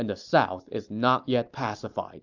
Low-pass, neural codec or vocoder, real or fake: 7.2 kHz; none; real